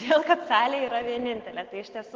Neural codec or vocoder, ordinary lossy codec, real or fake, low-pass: none; Opus, 16 kbps; real; 7.2 kHz